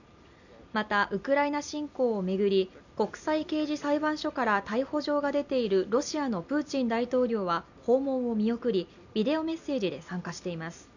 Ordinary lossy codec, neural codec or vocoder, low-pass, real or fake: none; none; 7.2 kHz; real